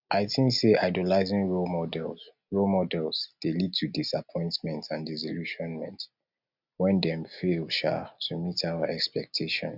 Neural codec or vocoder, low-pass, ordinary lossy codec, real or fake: none; 5.4 kHz; none; real